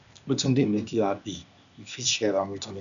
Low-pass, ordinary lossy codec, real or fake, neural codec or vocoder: 7.2 kHz; none; fake; codec, 16 kHz, 0.8 kbps, ZipCodec